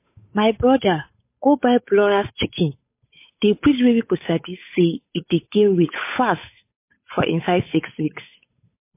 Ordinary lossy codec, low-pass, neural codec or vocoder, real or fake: MP3, 24 kbps; 3.6 kHz; codec, 16 kHz, 8 kbps, FunCodec, trained on Chinese and English, 25 frames a second; fake